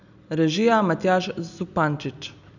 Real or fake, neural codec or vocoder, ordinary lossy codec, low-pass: real; none; none; 7.2 kHz